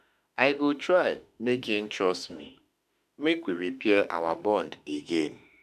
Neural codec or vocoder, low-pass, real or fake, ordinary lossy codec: autoencoder, 48 kHz, 32 numbers a frame, DAC-VAE, trained on Japanese speech; 14.4 kHz; fake; none